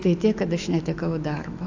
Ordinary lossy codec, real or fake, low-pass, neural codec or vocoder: AAC, 48 kbps; fake; 7.2 kHz; autoencoder, 48 kHz, 128 numbers a frame, DAC-VAE, trained on Japanese speech